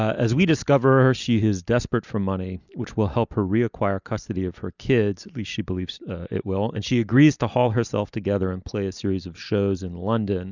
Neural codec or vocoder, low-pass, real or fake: none; 7.2 kHz; real